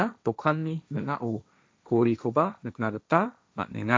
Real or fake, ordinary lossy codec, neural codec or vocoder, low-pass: fake; none; codec, 16 kHz, 1.1 kbps, Voila-Tokenizer; none